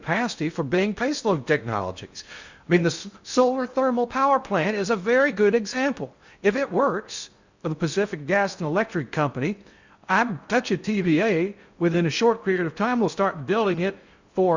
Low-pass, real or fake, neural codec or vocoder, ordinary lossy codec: 7.2 kHz; fake; codec, 16 kHz in and 24 kHz out, 0.6 kbps, FocalCodec, streaming, 2048 codes; Opus, 64 kbps